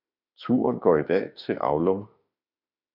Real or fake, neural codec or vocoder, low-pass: fake; autoencoder, 48 kHz, 32 numbers a frame, DAC-VAE, trained on Japanese speech; 5.4 kHz